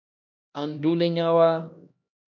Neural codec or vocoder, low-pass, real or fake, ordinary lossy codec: codec, 16 kHz, 1 kbps, X-Codec, HuBERT features, trained on LibriSpeech; 7.2 kHz; fake; MP3, 48 kbps